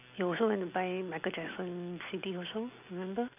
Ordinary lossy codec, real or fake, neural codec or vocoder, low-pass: none; real; none; 3.6 kHz